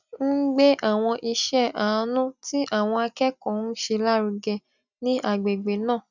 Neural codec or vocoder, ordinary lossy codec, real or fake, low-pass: none; none; real; 7.2 kHz